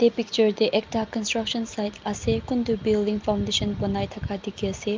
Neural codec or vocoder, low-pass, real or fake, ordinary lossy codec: none; 7.2 kHz; real; Opus, 24 kbps